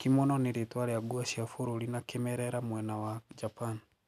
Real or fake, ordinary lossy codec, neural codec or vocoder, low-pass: real; none; none; 14.4 kHz